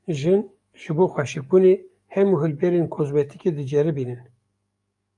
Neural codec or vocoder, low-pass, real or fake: codec, 44.1 kHz, 7.8 kbps, DAC; 10.8 kHz; fake